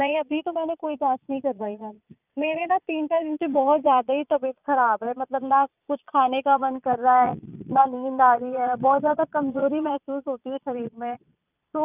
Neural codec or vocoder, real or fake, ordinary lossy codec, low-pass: vocoder, 22.05 kHz, 80 mel bands, Vocos; fake; none; 3.6 kHz